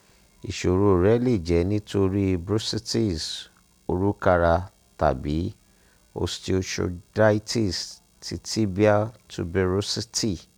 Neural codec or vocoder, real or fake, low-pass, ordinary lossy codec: none; real; 19.8 kHz; none